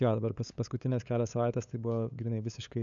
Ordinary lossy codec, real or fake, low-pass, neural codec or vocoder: MP3, 96 kbps; fake; 7.2 kHz; codec, 16 kHz, 8 kbps, FunCodec, trained on LibriTTS, 25 frames a second